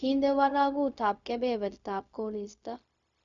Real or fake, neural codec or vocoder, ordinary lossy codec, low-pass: fake; codec, 16 kHz, 0.4 kbps, LongCat-Audio-Codec; none; 7.2 kHz